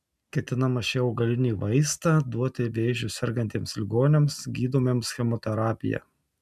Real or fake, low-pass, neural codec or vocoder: real; 14.4 kHz; none